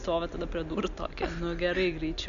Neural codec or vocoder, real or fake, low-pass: none; real; 7.2 kHz